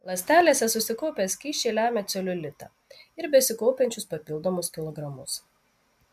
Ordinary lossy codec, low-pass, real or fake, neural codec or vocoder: MP3, 96 kbps; 14.4 kHz; real; none